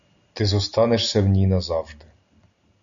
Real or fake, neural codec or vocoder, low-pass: real; none; 7.2 kHz